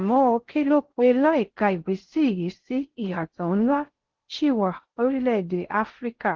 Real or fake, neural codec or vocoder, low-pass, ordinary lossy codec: fake; codec, 16 kHz in and 24 kHz out, 0.6 kbps, FocalCodec, streaming, 4096 codes; 7.2 kHz; Opus, 16 kbps